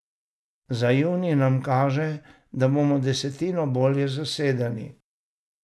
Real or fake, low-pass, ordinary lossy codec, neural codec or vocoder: real; none; none; none